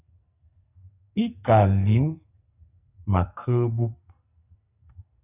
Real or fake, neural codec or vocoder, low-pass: fake; codec, 44.1 kHz, 2.6 kbps, SNAC; 3.6 kHz